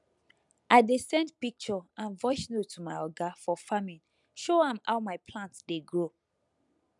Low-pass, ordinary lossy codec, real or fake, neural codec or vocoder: 10.8 kHz; none; real; none